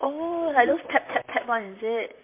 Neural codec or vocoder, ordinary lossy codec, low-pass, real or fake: vocoder, 44.1 kHz, 128 mel bands, Pupu-Vocoder; MP3, 24 kbps; 3.6 kHz; fake